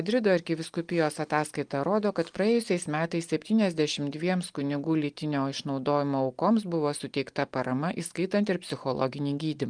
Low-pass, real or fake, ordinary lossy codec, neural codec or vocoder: 9.9 kHz; real; Opus, 32 kbps; none